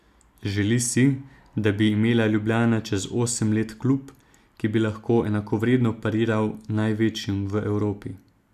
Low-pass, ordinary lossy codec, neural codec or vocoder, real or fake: 14.4 kHz; none; none; real